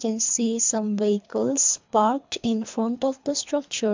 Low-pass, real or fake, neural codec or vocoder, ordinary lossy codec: 7.2 kHz; fake; codec, 24 kHz, 3 kbps, HILCodec; MP3, 64 kbps